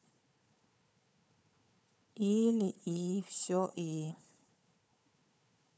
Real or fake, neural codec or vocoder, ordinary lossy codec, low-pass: fake; codec, 16 kHz, 4 kbps, FunCodec, trained on Chinese and English, 50 frames a second; none; none